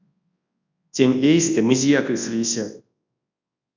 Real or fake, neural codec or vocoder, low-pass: fake; codec, 24 kHz, 0.9 kbps, WavTokenizer, large speech release; 7.2 kHz